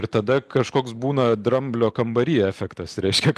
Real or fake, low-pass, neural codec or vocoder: real; 14.4 kHz; none